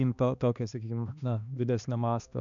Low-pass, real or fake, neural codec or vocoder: 7.2 kHz; fake; codec, 16 kHz, 2 kbps, X-Codec, HuBERT features, trained on balanced general audio